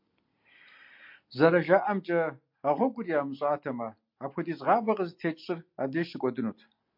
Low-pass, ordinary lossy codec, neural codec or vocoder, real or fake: 5.4 kHz; MP3, 48 kbps; none; real